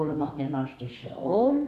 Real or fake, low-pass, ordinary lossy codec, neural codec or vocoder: fake; 14.4 kHz; none; codec, 32 kHz, 1.9 kbps, SNAC